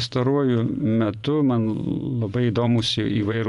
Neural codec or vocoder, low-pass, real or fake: vocoder, 24 kHz, 100 mel bands, Vocos; 10.8 kHz; fake